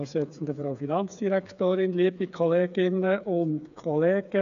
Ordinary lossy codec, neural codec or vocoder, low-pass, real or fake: none; codec, 16 kHz, 4 kbps, FreqCodec, smaller model; 7.2 kHz; fake